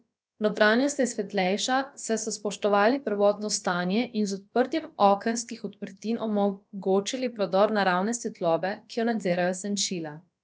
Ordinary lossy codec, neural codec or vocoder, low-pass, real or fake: none; codec, 16 kHz, about 1 kbps, DyCAST, with the encoder's durations; none; fake